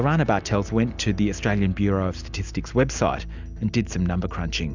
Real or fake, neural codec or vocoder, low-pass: real; none; 7.2 kHz